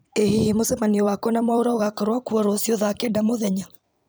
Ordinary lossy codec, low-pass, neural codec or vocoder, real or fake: none; none; vocoder, 44.1 kHz, 128 mel bands every 256 samples, BigVGAN v2; fake